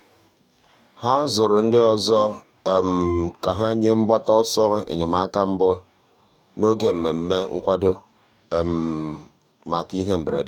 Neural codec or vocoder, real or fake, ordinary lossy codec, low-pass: codec, 44.1 kHz, 2.6 kbps, DAC; fake; none; 19.8 kHz